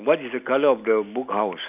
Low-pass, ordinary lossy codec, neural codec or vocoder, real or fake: 3.6 kHz; none; none; real